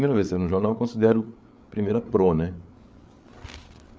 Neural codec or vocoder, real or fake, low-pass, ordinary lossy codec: codec, 16 kHz, 8 kbps, FreqCodec, larger model; fake; none; none